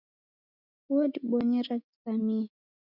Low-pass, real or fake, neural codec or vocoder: 5.4 kHz; real; none